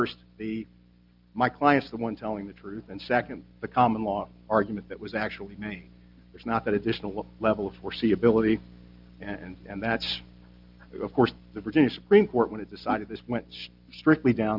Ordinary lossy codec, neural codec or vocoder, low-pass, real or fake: Opus, 24 kbps; none; 5.4 kHz; real